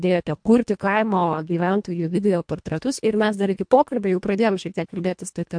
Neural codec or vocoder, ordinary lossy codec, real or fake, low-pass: codec, 24 kHz, 1.5 kbps, HILCodec; MP3, 64 kbps; fake; 9.9 kHz